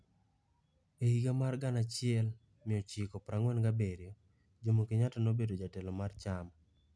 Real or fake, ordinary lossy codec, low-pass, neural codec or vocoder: real; none; none; none